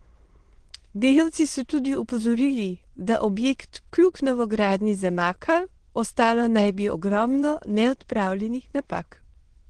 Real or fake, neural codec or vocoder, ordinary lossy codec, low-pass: fake; autoencoder, 22.05 kHz, a latent of 192 numbers a frame, VITS, trained on many speakers; Opus, 16 kbps; 9.9 kHz